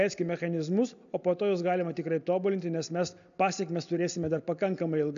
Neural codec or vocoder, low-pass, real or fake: none; 7.2 kHz; real